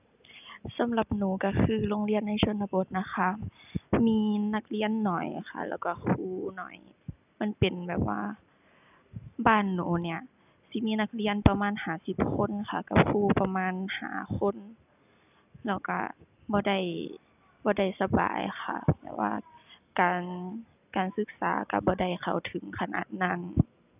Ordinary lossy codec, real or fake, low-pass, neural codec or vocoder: none; real; 3.6 kHz; none